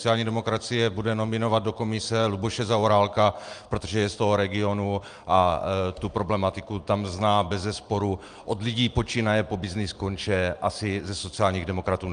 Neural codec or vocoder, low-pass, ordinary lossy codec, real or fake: none; 9.9 kHz; Opus, 32 kbps; real